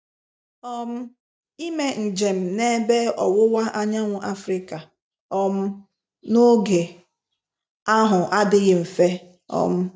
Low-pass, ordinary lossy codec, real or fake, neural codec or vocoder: none; none; real; none